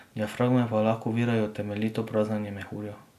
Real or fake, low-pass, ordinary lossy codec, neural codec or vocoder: real; 14.4 kHz; none; none